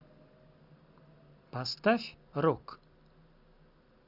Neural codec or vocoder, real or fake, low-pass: none; real; 5.4 kHz